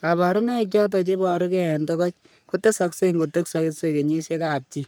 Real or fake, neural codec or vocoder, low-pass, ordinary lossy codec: fake; codec, 44.1 kHz, 3.4 kbps, Pupu-Codec; none; none